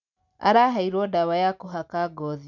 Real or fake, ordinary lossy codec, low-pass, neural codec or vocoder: real; none; 7.2 kHz; none